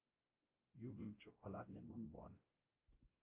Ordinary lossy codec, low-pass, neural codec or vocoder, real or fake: Opus, 24 kbps; 3.6 kHz; codec, 16 kHz, 0.5 kbps, X-Codec, WavLM features, trained on Multilingual LibriSpeech; fake